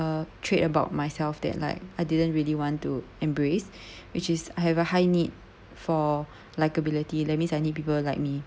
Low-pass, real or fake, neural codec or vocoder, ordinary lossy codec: none; real; none; none